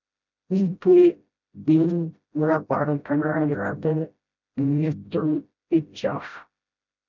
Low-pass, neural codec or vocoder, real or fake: 7.2 kHz; codec, 16 kHz, 0.5 kbps, FreqCodec, smaller model; fake